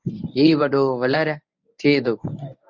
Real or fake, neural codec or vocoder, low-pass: fake; codec, 24 kHz, 0.9 kbps, WavTokenizer, medium speech release version 1; 7.2 kHz